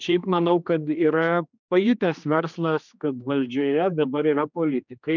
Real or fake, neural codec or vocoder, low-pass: fake; codec, 16 kHz, 2 kbps, X-Codec, HuBERT features, trained on general audio; 7.2 kHz